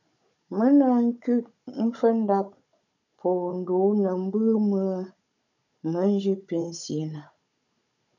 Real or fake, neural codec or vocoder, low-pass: fake; codec, 16 kHz, 16 kbps, FunCodec, trained on Chinese and English, 50 frames a second; 7.2 kHz